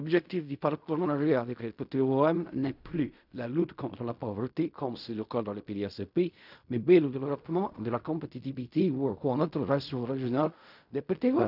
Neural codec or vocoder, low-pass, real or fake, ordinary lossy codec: codec, 16 kHz in and 24 kHz out, 0.4 kbps, LongCat-Audio-Codec, fine tuned four codebook decoder; 5.4 kHz; fake; none